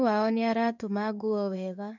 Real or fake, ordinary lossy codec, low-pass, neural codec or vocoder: fake; none; 7.2 kHz; codec, 16 kHz in and 24 kHz out, 1 kbps, XY-Tokenizer